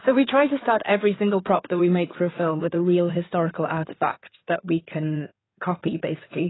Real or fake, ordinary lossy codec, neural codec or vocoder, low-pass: fake; AAC, 16 kbps; codec, 16 kHz in and 24 kHz out, 2.2 kbps, FireRedTTS-2 codec; 7.2 kHz